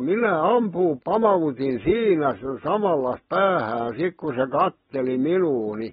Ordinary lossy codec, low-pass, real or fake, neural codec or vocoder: AAC, 16 kbps; 7.2 kHz; real; none